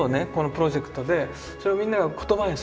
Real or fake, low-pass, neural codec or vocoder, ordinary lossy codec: real; none; none; none